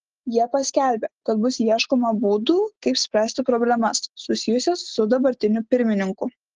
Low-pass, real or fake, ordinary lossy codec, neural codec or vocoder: 7.2 kHz; real; Opus, 16 kbps; none